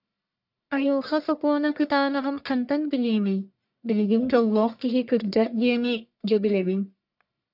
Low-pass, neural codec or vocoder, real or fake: 5.4 kHz; codec, 44.1 kHz, 1.7 kbps, Pupu-Codec; fake